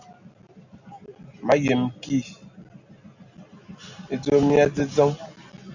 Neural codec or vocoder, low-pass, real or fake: none; 7.2 kHz; real